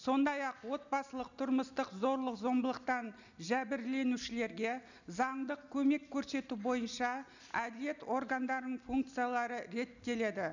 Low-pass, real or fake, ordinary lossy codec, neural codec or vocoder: 7.2 kHz; real; none; none